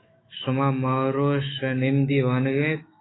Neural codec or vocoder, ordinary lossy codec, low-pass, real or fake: autoencoder, 48 kHz, 128 numbers a frame, DAC-VAE, trained on Japanese speech; AAC, 16 kbps; 7.2 kHz; fake